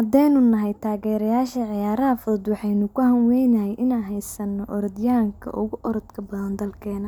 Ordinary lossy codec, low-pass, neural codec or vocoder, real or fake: none; 19.8 kHz; none; real